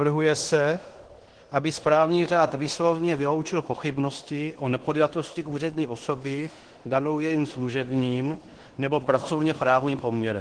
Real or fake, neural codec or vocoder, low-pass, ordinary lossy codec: fake; codec, 16 kHz in and 24 kHz out, 0.9 kbps, LongCat-Audio-Codec, fine tuned four codebook decoder; 9.9 kHz; Opus, 16 kbps